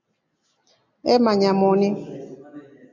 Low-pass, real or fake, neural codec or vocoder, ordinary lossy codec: 7.2 kHz; real; none; AAC, 48 kbps